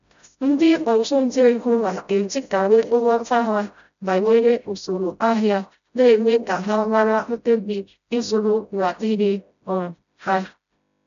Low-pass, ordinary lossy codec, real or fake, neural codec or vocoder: 7.2 kHz; MP3, 96 kbps; fake; codec, 16 kHz, 0.5 kbps, FreqCodec, smaller model